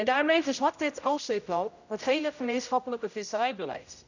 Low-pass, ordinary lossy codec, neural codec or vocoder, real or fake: 7.2 kHz; none; codec, 16 kHz, 0.5 kbps, X-Codec, HuBERT features, trained on general audio; fake